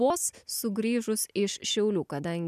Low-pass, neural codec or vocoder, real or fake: 14.4 kHz; none; real